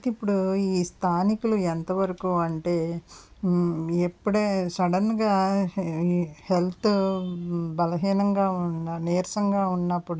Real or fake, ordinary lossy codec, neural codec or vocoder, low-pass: real; none; none; none